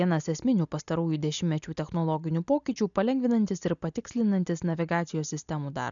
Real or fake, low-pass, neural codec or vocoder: real; 7.2 kHz; none